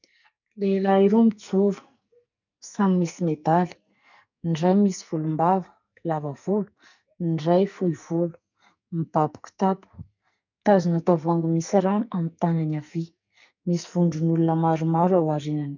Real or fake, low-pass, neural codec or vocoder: fake; 7.2 kHz; codec, 44.1 kHz, 2.6 kbps, SNAC